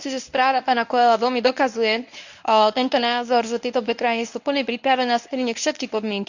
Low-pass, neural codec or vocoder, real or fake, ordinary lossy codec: 7.2 kHz; codec, 24 kHz, 0.9 kbps, WavTokenizer, medium speech release version 1; fake; none